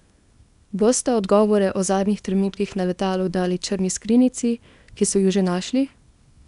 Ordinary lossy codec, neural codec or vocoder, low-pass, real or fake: none; codec, 24 kHz, 0.9 kbps, WavTokenizer, small release; 10.8 kHz; fake